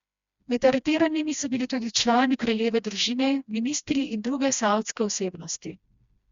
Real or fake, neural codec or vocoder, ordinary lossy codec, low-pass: fake; codec, 16 kHz, 1 kbps, FreqCodec, smaller model; Opus, 64 kbps; 7.2 kHz